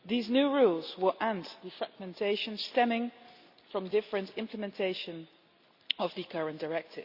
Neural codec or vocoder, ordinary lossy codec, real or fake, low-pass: none; Opus, 64 kbps; real; 5.4 kHz